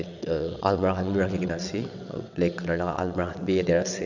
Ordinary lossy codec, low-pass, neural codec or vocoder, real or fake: none; 7.2 kHz; vocoder, 22.05 kHz, 80 mel bands, WaveNeXt; fake